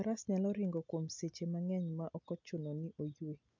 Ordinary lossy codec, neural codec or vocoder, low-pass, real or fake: MP3, 64 kbps; none; 7.2 kHz; real